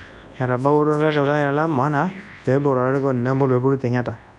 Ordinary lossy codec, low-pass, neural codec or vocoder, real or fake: none; 10.8 kHz; codec, 24 kHz, 0.9 kbps, WavTokenizer, large speech release; fake